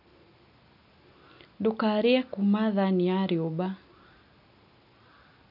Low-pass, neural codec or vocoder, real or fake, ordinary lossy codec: 5.4 kHz; none; real; none